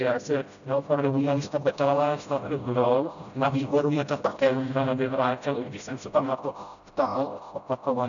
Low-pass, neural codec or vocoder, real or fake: 7.2 kHz; codec, 16 kHz, 0.5 kbps, FreqCodec, smaller model; fake